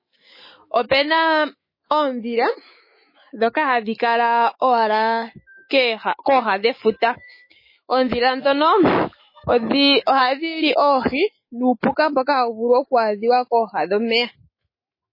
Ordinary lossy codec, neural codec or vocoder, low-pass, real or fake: MP3, 24 kbps; autoencoder, 48 kHz, 128 numbers a frame, DAC-VAE, trained on Japanese speech; 5.4 kHz; fake